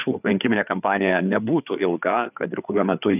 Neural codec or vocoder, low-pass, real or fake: codec, 16 kHz, 4 kbps, FreqCodec, larger model; 3.6 kHz; fake